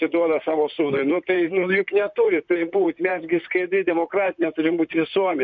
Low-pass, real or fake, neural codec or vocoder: 7.2 kHz; fake; vocoder, 44.1 kHz, 128 mel bands, Pupu-Vocoder